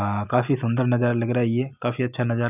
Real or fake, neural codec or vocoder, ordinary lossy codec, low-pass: real; none; none; 3.6 kHz